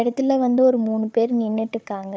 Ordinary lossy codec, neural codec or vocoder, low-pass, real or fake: none; codec, 16 kHz, 4 kbps, FunCodec, trained on Chinese and English, 50 frames a second; none; fake